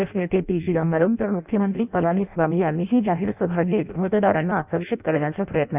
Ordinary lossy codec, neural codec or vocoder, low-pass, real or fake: none; codec, 16 kHz in and 24 kHz out, 0.6 kbps, FireRedTTS-2 codec; 3.6 kHz; fake